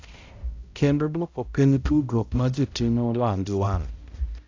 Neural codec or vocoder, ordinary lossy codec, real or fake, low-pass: codec, 16 kHz, 0.5 kbps, X-Codec, HuBERT features, trained on balanced general audio; AAC, 48 kbps; fake; 7.2 kHz